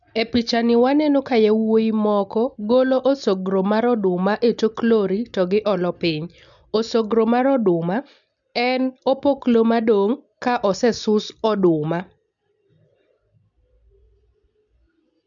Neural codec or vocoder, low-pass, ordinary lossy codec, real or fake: none; 7.2 kHz; none; real